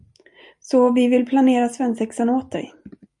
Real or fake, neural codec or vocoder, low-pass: real; none; 10.8 kHz